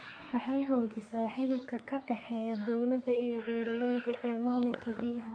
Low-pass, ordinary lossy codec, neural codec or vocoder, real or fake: 9.9 kHz; AAC, 64 kbps; codec, 24 kHz, 1 kbps, SNAC; fake